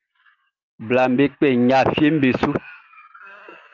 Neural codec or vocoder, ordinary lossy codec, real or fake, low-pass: none; Opus, 24 kbps; real; 7.2 kHz